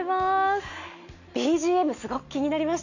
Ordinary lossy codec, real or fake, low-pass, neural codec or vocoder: none; real; 7.2 kHz; none